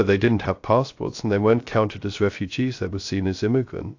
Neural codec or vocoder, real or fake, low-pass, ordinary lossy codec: codec, 16 kHz, 0.3 kbps, FocalCodec; fake; 7.2 kHz; AAC, 48 kbps